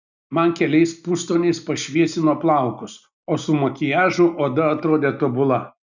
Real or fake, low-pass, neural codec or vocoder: real; 7.2 kHz; none